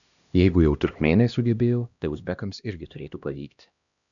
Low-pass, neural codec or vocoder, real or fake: 7.2 kHz; codec, 16 kHz, 1 kbps, X-Codec, HuBERT features, trained on LibriSpeech; fake